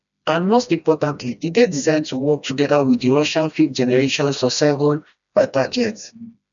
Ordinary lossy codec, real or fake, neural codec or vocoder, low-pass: none; fake; codec, 16 kHz, 1 kbps, FreqCodec, smaller model; 7.2 kHz